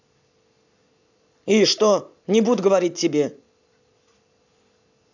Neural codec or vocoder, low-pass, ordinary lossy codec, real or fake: none; 7.2 kHz; none; real